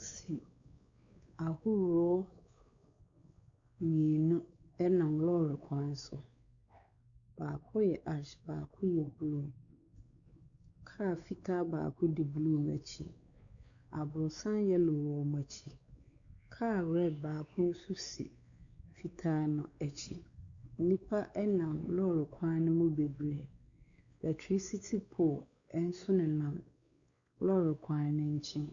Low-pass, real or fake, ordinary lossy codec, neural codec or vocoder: 7.2 kHz; fake; Opus, 64 kbps; codec, 16 kHz, 4 kbps, X-Codec, WavLM features, trained on Multilingual LibriSpeech